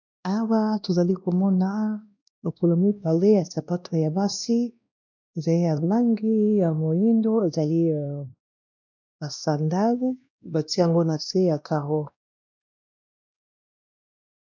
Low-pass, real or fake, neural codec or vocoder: 7.2 kHz; fake; codec, 16 kHz, 1 kbps, X-Codec, WavLM features, trained on Multilingual LibriSpeech